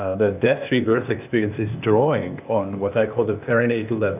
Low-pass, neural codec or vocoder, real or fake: 3.6 kHz; codec, 16 kHz, 0.8 kbps, ZipCodec; fake